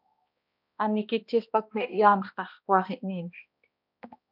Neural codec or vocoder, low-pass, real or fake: codec, 16 kHz, 1 kbps, X-Codec, HuBERT features, trained on balanced general audio; 5.4 kHz; fake